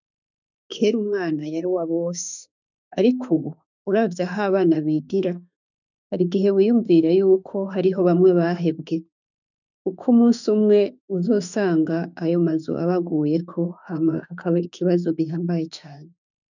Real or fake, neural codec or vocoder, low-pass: fake; autoencoder, 48 kHz, 32 numbers a frame, DAC-VAE, trained on Japanese speech; 7.2 kHz